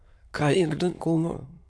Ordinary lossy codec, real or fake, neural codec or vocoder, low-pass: none; fake; autoencoder, 22.05 kHz, a latent of 192 numbers a frame, VITS, trained on many speakers; none